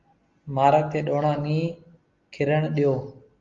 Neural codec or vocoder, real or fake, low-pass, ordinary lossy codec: none; real; 7.2 kHz; Opus, 32 kbps